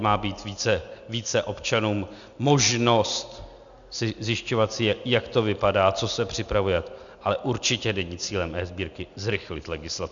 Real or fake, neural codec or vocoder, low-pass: real; none; 7.2 kHz